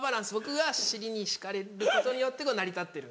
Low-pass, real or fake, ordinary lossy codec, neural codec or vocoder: none; real; none; none